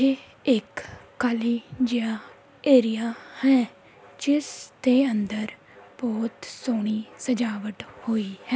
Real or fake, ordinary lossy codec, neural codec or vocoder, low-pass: real; none; none; none